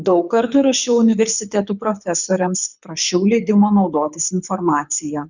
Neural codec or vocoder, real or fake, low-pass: codec, 24 kHz, 6 kbps, HILCodec; fake; 7.2 kHz